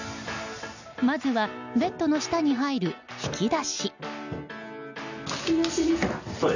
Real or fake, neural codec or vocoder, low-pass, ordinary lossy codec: real; none; 7.2 kHz; none